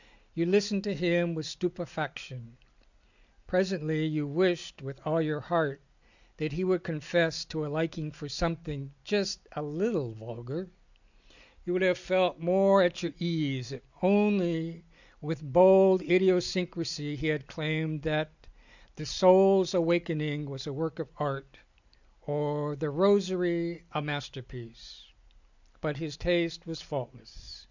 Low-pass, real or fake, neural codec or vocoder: 7.2 kHz; real; none